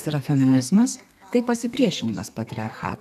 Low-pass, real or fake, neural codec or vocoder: 14.4 kHz; fake; codec, 32 kHz, 1.9 kbps, SNAC